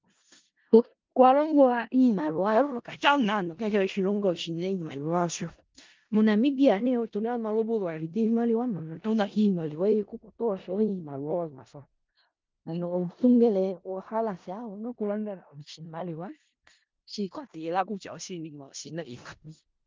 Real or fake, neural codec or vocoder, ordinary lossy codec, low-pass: fake; codec, 16 kHz in and 24 kHz out, 0.4 kbps, LongCat-Audio-Codec, four codebook decoder; Opus, 32 kbps; 7.2 kHz